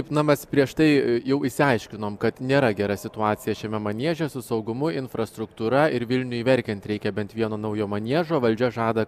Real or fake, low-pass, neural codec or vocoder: real; 14.4 kHz; none